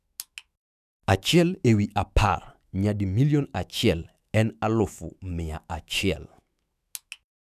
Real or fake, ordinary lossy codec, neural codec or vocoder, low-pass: fake; none; autoencoder, 48 kHz, 128 numbers a frame, DAC-VAE, trained on Japanese speech; 14.4 kHz